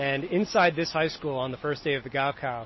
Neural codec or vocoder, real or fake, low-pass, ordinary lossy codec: none; real; 7.2 kHz; MP3, 24 kbps